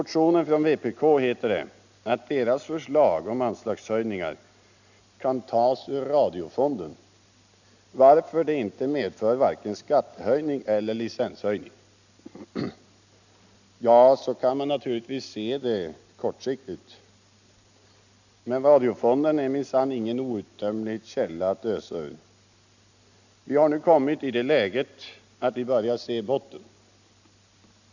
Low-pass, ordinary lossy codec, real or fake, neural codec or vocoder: 7.2 kHz; none; real; none